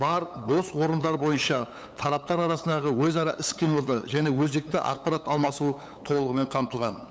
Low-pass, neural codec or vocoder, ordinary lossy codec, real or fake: none; codec, 16 kHz, 8 kbps, FunCodec, trained on LibriTTS, 25 frames a second; none; fake